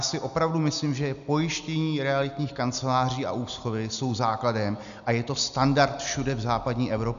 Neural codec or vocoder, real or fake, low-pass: none; real; 7.2 kHz